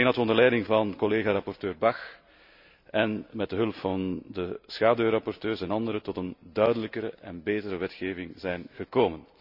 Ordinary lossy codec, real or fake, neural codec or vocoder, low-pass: none; real; none; 5.4 kHz